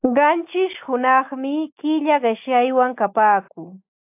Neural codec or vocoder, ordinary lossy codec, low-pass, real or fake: none; AAC, 32 kbps; 3.6 kHz; real